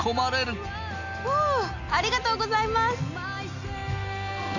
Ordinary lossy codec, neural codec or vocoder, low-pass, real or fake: none; none; 7.2 kHz; real